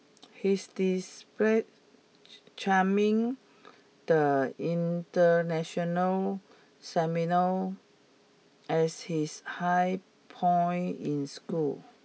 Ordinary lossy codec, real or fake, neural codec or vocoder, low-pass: none; real; none; none